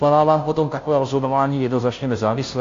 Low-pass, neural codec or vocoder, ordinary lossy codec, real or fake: 7.2 kHz; codec, 16 kHz, 0.5 kbps, FunCodec, trained on Chinese and English, 25 frames a second; AAC, 48 kbps; fake